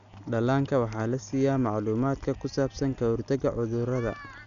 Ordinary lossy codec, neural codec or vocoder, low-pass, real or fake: none; none; 7.2 kHz; real